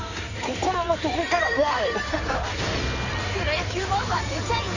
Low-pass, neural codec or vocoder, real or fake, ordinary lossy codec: 7.2 kHz; codec, 16 kHz in and 24 kHz out, 2.2 kbps, FireRedTTS-2 codec; fake; none